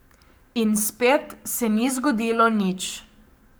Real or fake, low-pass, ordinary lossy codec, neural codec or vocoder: fake; none; none; codec, 44.1 kHz, 7.8 kbps, Pupu-Codec